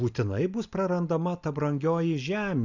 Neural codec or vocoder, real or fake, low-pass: none; real; 7.2 kHz